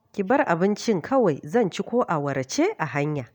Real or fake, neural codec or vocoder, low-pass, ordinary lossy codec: real; none; 19.8 kHz; none